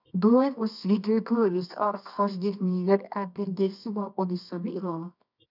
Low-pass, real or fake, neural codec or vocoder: 5.4 kHz; fake; codec, 24 kHz, 0.9 kbps, WavTokenizer, medium music audio release